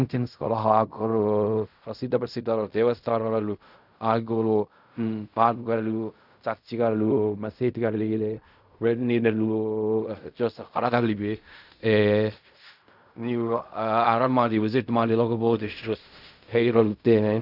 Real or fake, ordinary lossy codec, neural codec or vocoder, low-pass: fake; none; codec, 16 kHz in and 24 kHz out, 0.4 kbps, LongCat-Audio-Codec, fine tuned four codebook decoder; 5.4 kHz